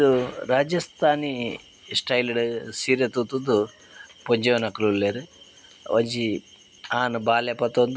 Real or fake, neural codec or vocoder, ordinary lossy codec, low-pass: real; none; none; none